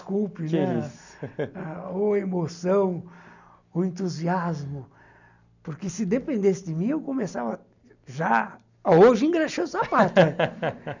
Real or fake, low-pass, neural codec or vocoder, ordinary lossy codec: real; 7.2 kHz; none; none